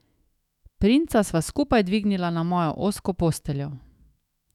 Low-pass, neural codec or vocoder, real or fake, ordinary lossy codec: 19.8 kHz; autoencoder, 48 kHz, 128 numbers a frame, DAC-VAE, trained on Japanese speech; fake; none